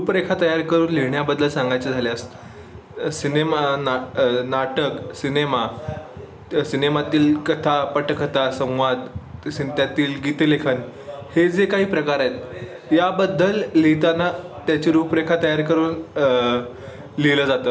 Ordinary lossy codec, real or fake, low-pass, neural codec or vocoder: none; real; none; none